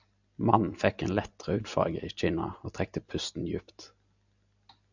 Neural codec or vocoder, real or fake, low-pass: none; real; 7.2 kHz